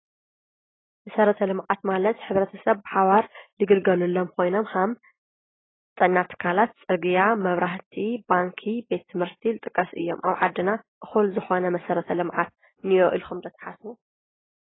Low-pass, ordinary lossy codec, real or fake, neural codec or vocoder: 7.2 kHz; AAC, 16 kbps; real; none